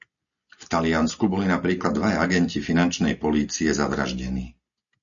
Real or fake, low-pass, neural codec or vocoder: real; 7.2 kHz; none